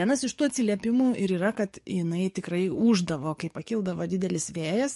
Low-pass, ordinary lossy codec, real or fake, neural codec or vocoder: 14.4 kHz; MP3, 48 kbps; fake; codec, 44.1 kHz, 7.8 kbps, DAC